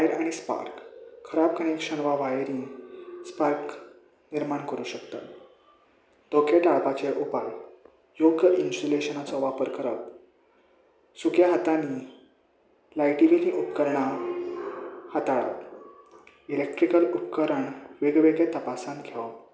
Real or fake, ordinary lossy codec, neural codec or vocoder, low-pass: real; none; none; none